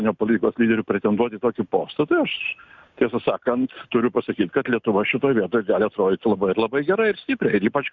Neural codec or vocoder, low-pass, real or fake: none; 7.2 kHz; real